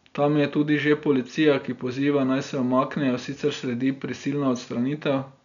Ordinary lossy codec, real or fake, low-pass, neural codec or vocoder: none; real; 7.2 kHz; none